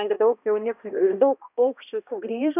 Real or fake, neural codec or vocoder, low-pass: fake; codec, 16 kHz, 1 kbps, X-Codec, HuBERT features, trained on balanced general audio; 3.6 kHz